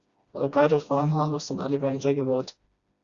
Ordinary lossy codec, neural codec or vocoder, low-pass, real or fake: Opus, 64 kbps; codec, 16 kHz, 1 kbps, FreqCodec, smaller model; 7.2 kHz; fake